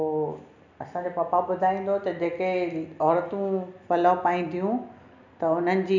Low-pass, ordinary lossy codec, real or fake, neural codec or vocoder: 7.2 kHz; none; real; none